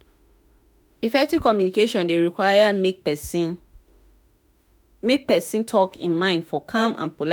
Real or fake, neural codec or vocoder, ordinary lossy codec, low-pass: fake; autoencoder, 48 kHz, 32 numbers a frame, DAC-VAE, trained on Japanese speech; none; none